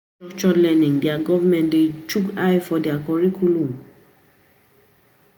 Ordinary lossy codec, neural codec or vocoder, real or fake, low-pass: none; none; real; none